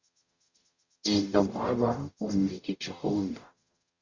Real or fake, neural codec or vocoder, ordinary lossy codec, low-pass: fake; codec, 44.1 kHz, 0.9 kbps, DAC; Opus, 64 kbps; 7.2 kHz